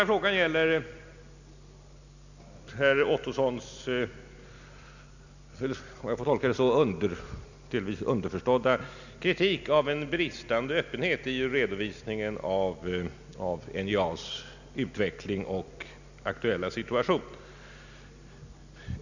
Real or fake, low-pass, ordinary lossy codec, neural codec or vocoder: real; 7.2 kHz; none; none